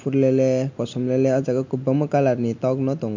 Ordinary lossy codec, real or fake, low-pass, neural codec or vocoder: MP3, 64 kbps; real; 7.2 kHz; none